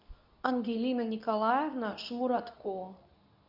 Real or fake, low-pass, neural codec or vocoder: fake; 5.4 kHz; codec, 24 kHz, 0.9 kbps, WavTokenizer, medium speech release version 2